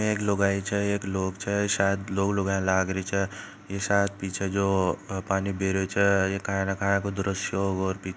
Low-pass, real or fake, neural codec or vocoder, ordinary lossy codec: none; real; none; none